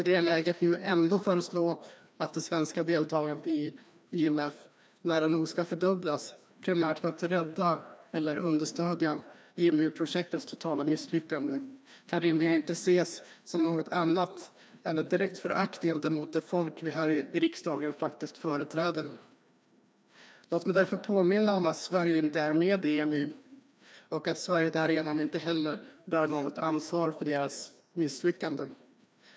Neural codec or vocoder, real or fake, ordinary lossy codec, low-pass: codec, 16 kHz, 1 kbps, FreqCodec, larger model; fake; none; none